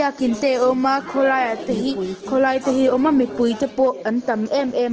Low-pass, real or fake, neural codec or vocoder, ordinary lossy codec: 7.2 kHz; real; none; Opus, 16 kbps